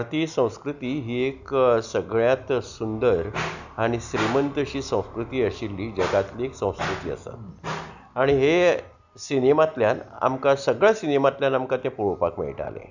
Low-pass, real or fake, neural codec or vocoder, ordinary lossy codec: 7.2 kHz; real; none; none